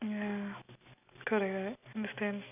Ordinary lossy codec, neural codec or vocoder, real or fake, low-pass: none; none; real; 3.6 kHz